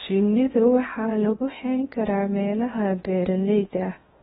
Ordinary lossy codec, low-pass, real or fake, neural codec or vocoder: AAC, 16 kbps; 10.8 kHz; fake; codec, 16 kHz in and 24 kHz out, 0.6 kbps, FocalCodec, streaming, 2048 codes